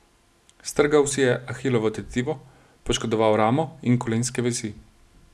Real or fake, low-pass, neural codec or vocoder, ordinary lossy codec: real; none; none; none